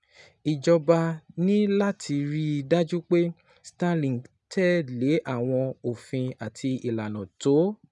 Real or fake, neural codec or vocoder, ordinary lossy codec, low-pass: real; none; none; 10.8 kHz